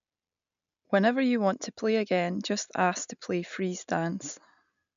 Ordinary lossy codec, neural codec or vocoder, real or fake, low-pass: none; none; real; 7.2 kHz